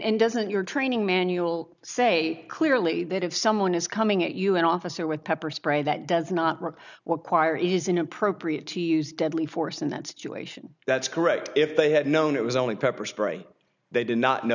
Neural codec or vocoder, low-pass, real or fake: none; 7.2 kHz; real